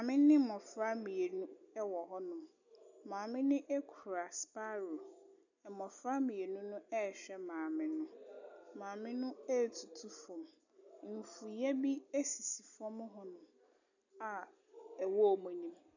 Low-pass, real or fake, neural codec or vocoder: 7.2 kHz; real; none